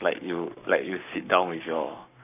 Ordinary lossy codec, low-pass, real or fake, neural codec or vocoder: none; 3.6 kHz; fake; codec, 44.1 kHz, 7.8 kbps, Pupu-Codec